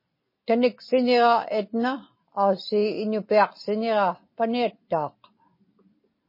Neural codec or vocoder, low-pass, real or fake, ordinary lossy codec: none; 5.4 kHz; real; MP3, 24 kbps